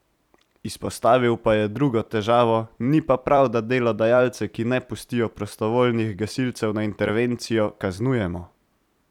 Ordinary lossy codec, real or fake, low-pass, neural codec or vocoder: none; fake; 19.8 kHz; vocoder, 44.1 kHz, 128 mel bands every 256 samples, BigVGAN v2